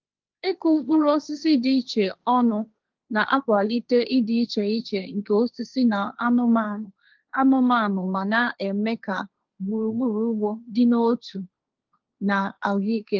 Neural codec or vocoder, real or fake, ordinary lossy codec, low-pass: codec, 16 kHz, 1.1 kbps, Voila-Tokenizer; fake; Opus, 32 kbps; 7.2 kHz